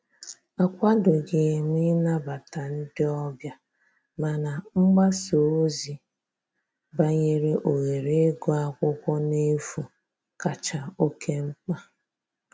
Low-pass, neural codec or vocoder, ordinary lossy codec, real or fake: none; none; none; real